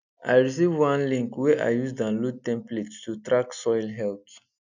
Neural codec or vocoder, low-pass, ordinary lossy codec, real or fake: none; 7.2 kHz; none; real